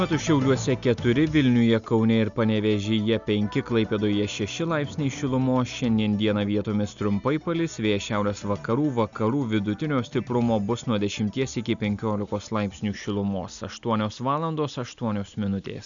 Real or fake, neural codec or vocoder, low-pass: real; none; 7.2 kHz